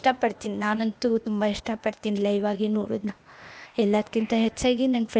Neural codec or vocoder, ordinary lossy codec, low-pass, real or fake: codec, 16 kHz, 0.8 kbps, ZipCodec; none; none; fake